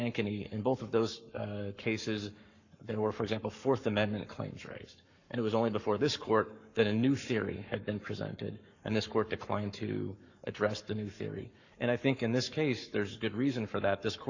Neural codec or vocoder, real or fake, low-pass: codec, 44.1 kHz, 7.8 kbps, Pupu-Codec; fake; 7.2 kHz